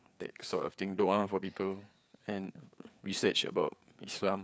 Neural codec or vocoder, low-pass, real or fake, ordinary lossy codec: codec, 16 kHz, 4 kbps, FreqCodec, larger model; none; fake; none